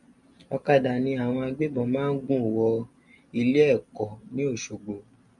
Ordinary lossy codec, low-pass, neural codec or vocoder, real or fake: MP3, 64 kbps; 10.8 kHz; none; real